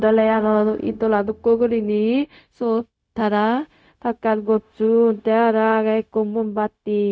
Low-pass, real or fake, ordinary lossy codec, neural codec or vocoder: none; fake; none; codec, 16 kHz, 0.4 kbps, LongCat-Audio-Codec